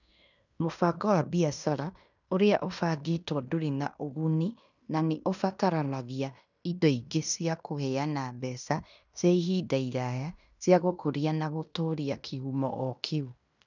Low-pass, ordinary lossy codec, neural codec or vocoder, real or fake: 7.2 kHz; none; codec, 16 kHz in and 24 kHz out, 0.9 kbps, LongCat-Audio-Codec, fine tuned four codebook decoder; fake